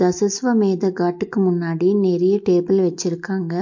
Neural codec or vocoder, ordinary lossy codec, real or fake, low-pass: none; MP3, 48 kbps; real; 7.2 kHz